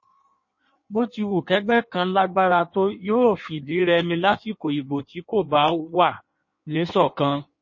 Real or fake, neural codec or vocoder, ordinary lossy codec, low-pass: fake; codec, 16 kHz in and 24 kHz out, 1.1 kbps, FireRedTTS-2 codec; MP3, 32 kbps; 7.2 kHz